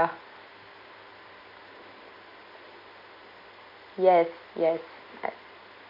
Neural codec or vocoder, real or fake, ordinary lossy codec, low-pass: none; real; none; 5.4 kHz